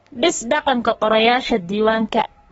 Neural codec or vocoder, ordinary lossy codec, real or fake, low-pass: codec, 32 kHz, 1.9 kbps, SNAC; AAC, 24 kbps; fake; 14.4 kHz